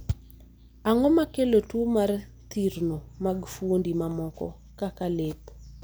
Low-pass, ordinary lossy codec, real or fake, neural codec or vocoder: none; none; real; none